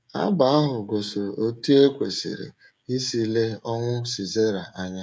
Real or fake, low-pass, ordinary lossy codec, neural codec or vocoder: fake; none; none; codec, 16 kHz, 16 kbps, FreqCodec, smaller model